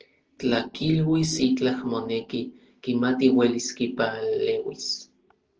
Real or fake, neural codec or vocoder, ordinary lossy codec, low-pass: real; none; Opus, 16 kbps; 7.2 kHz